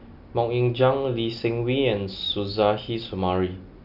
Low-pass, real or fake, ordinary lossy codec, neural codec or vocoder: 5.4 kHz; real; none; none